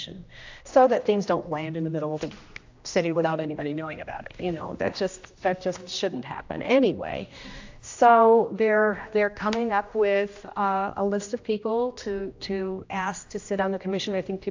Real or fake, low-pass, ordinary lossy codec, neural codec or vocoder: fake; 7.2 kHz; AAC, 48 kbps; codec, 16 kHz, 1 kbps, X-Codec, HuBERT features, trained on general audio